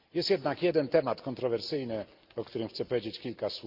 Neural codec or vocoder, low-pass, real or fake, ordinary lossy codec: none; 5.4 kHz; real; Opus, 32 kbps